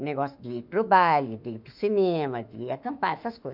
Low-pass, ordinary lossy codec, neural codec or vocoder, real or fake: 5.4 kHz; none; autoencoder, 48 kHz, 32 numbers a frame, DAC-VAE, trained on Japanese speech; fake